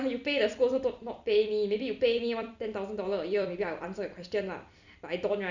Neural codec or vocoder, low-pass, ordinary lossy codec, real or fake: vocoder, 44.1 kHz, 128 mel bands every 256 samples, BigVGAN v2; 7.2 kHz; none; fake